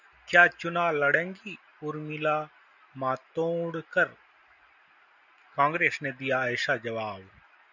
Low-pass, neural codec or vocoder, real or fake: 7.2 kHz; none; real